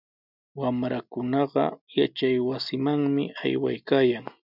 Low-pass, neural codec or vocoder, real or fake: 5.4 kHz; none; real